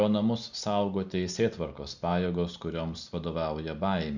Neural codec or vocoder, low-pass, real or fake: none; 7.2 kHz; real